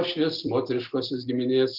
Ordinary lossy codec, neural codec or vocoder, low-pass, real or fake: Opus, 24 kbps; none; 5.4 kHz; real